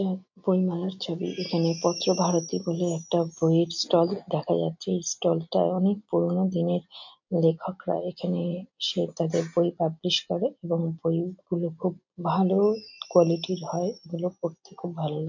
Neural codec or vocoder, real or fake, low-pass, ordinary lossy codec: none; real; 7.2 kHz; MP3, 48 kbps